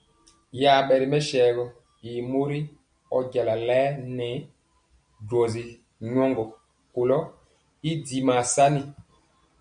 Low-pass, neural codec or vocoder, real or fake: 9.9 kHz; none; real